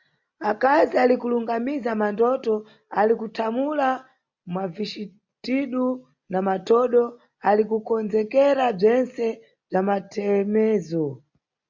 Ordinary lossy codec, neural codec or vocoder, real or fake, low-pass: MP3, 64 kbps; none; real; 7.2 kHz